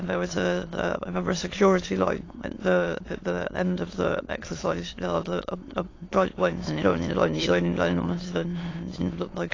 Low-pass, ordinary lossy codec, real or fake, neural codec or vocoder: 7.2 kHz; AAC, 32 kbps; fake; autoencoder, 22.05 kHz, a latent of 192 numbers a frame, VITS, trained on many speakers